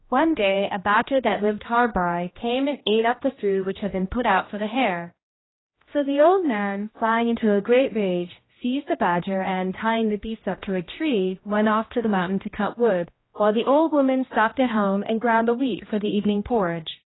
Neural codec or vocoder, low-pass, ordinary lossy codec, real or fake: codec, 16 kHz, 1 kbps, X-Codec, HuBERT features, trained on general audio; 7.2 kHz; AAC, 16 kbps; fake